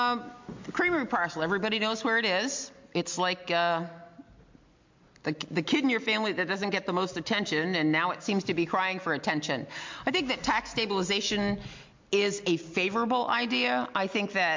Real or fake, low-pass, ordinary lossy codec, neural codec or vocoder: real; 7.2 kHz; MP3, 48 kbps; none